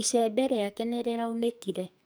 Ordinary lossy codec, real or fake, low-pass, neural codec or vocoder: none; fake; none; codec, 44.1 kHz, 2.6 kbps, SNAC